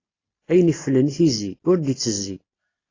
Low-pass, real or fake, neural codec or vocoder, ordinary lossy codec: 7.2 kHz; real; none; AAC, 32 kbps